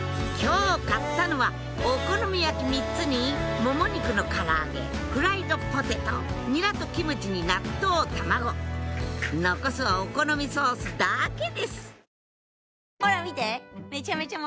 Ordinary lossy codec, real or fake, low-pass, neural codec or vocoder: none; real; none; none